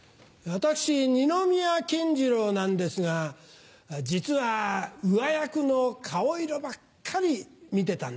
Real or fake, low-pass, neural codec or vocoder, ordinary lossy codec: real; none; none; none